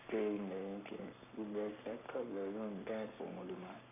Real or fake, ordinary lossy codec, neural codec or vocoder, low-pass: fake; none; codec, 44.1 kHz, 7.8 kbps, Pupu-Codec; 3.6 kHz